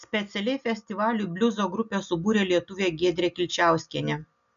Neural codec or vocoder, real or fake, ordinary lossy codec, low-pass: none; real; MP3, 96 kbps; 7.2 kHz